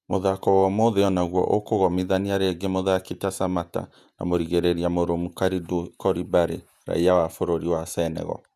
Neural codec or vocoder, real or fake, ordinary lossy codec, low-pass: vocoder, 48 kHz, 128 mel bands, Vocos; fake; none; 14.4 kHz